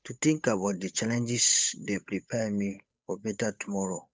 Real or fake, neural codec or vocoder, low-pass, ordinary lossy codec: fake; codec, 16 kHz, 8 kbps, FunCodec, trained on Chinese and English, 25 frames a second; none; none